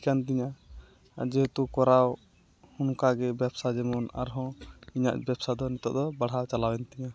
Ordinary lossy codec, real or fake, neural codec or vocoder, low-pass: none; real; none; none